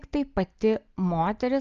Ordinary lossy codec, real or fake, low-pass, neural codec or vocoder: Opus, 24 kbps; real; 7.2 kHz; none